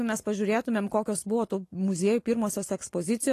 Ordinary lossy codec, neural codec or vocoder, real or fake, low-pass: AAC, 48 kbps; none; real; 14.4 kHz